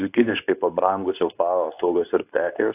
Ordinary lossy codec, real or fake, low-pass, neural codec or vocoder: AAC, 32 kbps; fake; 3.6 kHz; codec, 24 kHz, 0.9 kbps, WavTokenizer, medium speech release version 2